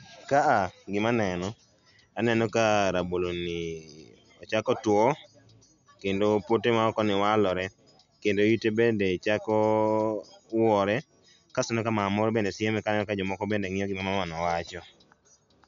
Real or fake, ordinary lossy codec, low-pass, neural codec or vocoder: real; none; 7.2 kHz; none